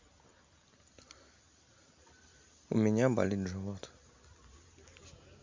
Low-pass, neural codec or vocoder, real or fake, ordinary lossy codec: 7.2 kHz; none; real; MP3, 48 kbps